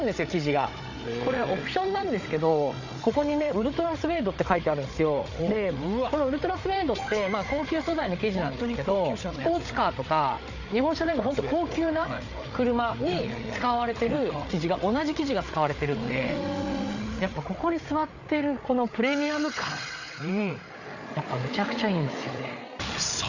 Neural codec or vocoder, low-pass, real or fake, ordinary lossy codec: codec, 16 kHz, 8 kbps, FreqCodec, larger model; 7.2 kHz; fake; none